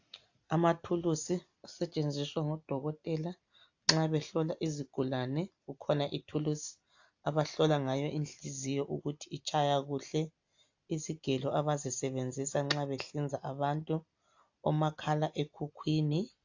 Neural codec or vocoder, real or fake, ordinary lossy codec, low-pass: none; real; AAC, 48 kbps; 7.2 kHz